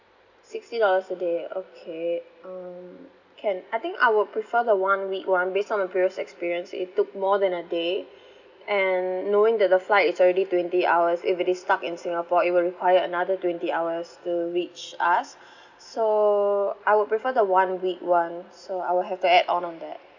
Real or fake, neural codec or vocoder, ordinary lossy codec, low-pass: real; none; none; 7.2 kHz